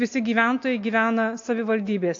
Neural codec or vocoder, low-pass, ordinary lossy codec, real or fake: none; 7.2 kHz; AAC, 48 kbps; real